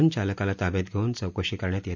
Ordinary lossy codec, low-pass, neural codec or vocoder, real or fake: MP3, 64 kbps; 7.2 kHz; none; real